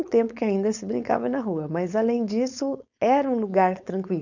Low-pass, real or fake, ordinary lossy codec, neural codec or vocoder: 7.2 kHz; fake; none; codec, 16 kHz, 4.8 kbps, FACodec